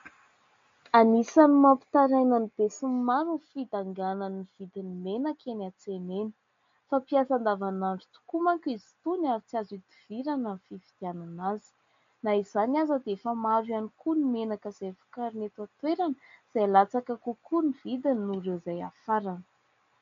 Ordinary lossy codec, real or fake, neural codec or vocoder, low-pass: MP3, 48 kbps; real; none; 7.2 kHz